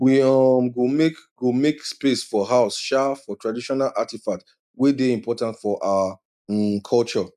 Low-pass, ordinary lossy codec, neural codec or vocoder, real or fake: 14.4 kHz; none; none; real